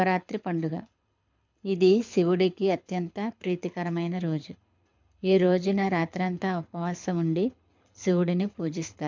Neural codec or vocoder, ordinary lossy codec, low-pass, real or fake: codec, 24 kHz, 6 kbps, HILCodec; MP3, 64 kbps; 7.2 kHz; fake